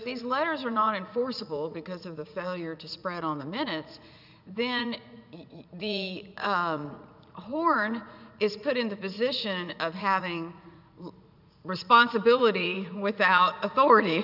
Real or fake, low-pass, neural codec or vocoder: fake; 5.4 kHz; vocoder, 44.1 kHz, 80 mel bands, Vocos